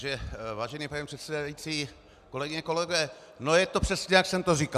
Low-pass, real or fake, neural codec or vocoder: 14.4 kHz; real; none